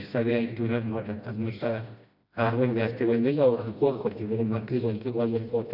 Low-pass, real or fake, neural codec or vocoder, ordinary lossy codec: 5.4 kHz; fake; codec, 16 kHz, 1 kbps, FreqCodec, smaller model; none